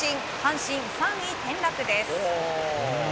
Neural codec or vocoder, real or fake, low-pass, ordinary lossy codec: none; real; none; none